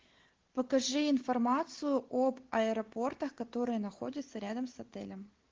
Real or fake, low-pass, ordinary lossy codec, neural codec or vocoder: real; 7.2 kHz; Opus, 16 kbps; none